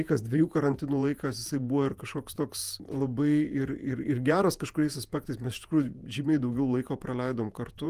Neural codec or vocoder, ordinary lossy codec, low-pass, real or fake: vocoder, 48 kHz, 128 mel bands, Vocos; Opus, 32 kbps; 14.4 kHz; fake